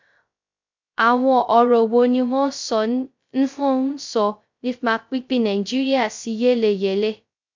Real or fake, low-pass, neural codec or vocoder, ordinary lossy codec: fake; 7.2 kHz; codec, 16 kHz, 0.2 kbps, FocalCodec; MP3, 64 kbps